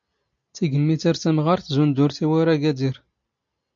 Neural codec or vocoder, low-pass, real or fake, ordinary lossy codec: none; 7.2 kHz; real; MP3, 48 kbps